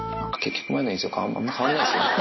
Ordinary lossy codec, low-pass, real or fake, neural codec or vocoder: MP3, 24 kbps; 7.2 kHz; real; none